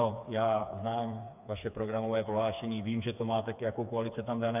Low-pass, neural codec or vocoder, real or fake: 3.6 kHz; codec, 16 kHz, 4 kbps, FreqCodec, smaller model; fake